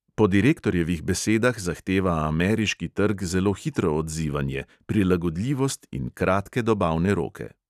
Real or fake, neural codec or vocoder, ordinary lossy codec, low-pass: real; none; none; 14.4 kHz